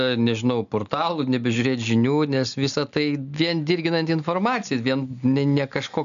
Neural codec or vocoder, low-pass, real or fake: none; 7.2 kHz; real